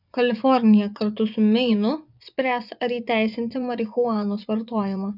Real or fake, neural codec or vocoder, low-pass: fake; vocoder, 24 kHz, 100 mel bands, Vocos; 5.4 kHz